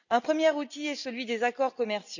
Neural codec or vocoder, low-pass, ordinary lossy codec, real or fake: none; 7.2 kHz; none; real